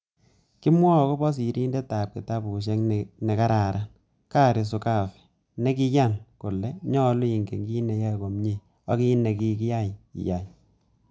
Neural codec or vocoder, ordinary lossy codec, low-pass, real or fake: none; none; none; real